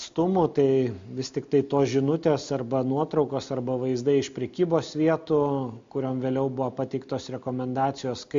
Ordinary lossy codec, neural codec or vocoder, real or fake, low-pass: MP3, 48 kbps; none; real; 7.2 kHz